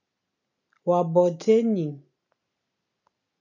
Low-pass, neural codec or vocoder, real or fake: 7.2 kHz; none; real